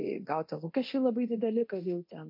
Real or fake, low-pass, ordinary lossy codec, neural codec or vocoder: fake; 5.4 kHz; MP3, 32 kbps; codec, 24 kHz, 0.9 kbps, DualCodec